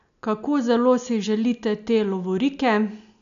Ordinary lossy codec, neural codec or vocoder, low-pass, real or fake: none; none; 7.2 kHz; real